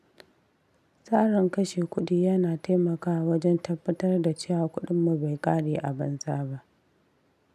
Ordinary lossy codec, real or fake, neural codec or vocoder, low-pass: none; real; none; 14.4 kHz